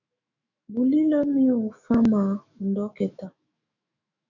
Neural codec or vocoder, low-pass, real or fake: autoencoder, 48 kHz, 128 numbers a frame, DAC-VAE, trained on Japanese speech; 7.2 kHz; fake